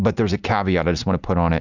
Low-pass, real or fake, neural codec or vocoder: 7.2 kHz; real; none